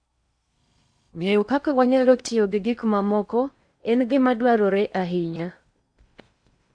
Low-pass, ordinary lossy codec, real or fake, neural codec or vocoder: 9.9 kHz; Opus, 64 kbps; fake; codec, 16 kHz in and 24 kHz out, 0.8 kbps, FocalCodec, streaming, 65536 codes